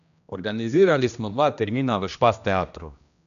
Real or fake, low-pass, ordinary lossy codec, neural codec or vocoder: fake; 7.2 kHz; none; codec, 16 kHz, 1 kbps, X-Codec, HuBERT features, trained on general audio